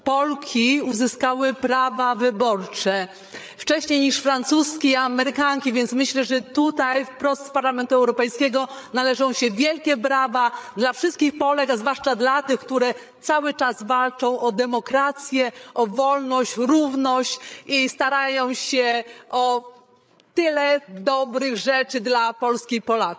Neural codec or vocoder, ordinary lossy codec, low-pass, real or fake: codec, 16 kHz, 8 kbps, FreqCodec, larger model; none; none; fake